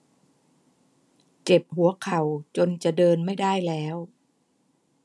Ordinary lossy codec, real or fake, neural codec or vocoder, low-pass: none; real; none; none